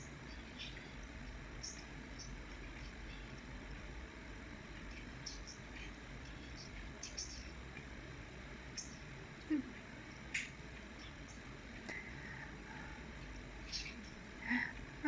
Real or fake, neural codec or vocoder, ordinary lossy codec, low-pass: fake; codec, 16 kHz, 16 kbps, FreqCodec, larger model; none; none